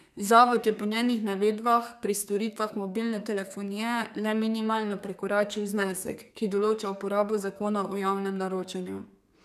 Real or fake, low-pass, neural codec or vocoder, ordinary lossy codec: fake; 14.4 kHz; codec, 32 kHz, 1.9 kbps, SNAC; none